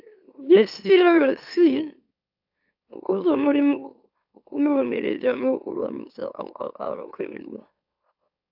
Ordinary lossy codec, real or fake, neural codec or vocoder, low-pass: AAC, 48 kbps; fake; autoencoder, 44.1 kHz, a latent of 192 numbers a frame, MeloTTS; 5.4 kHz